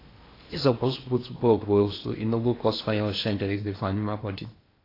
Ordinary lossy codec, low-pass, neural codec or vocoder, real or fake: AAC, 24 kbps; 5.4 kHz; codec, 16 kHz in and 24 kHz out, 0.8 kbps, FocalCodec, streaming, 65536 codes; fake